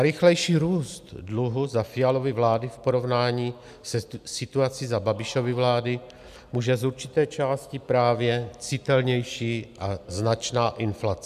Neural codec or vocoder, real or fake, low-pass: none; real; 14.4 kHz